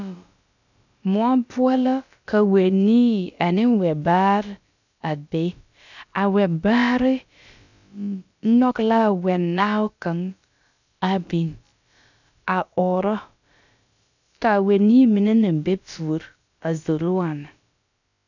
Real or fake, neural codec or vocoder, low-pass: fake; codec, 16 kHz, about 1 kbps, DyCAST, with the encoder's durations; 7.2 kHz